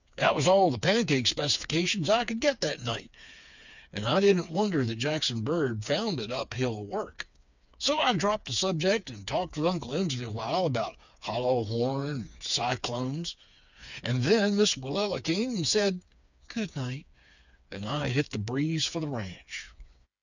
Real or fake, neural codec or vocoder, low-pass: fake; codec, 16 kHz, 4 kbps, FreqCodec, smaller model; 7.2 kHz